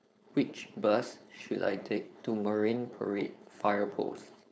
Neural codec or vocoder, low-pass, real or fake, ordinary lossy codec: codec, 16 kHz, 4.8 kbps, FACodec; none; fake; none